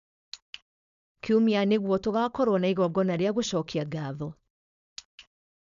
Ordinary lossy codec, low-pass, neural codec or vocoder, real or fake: none; 7.2 kHz; codec, 16 kHz, 4.8 kbps, FACodec; fake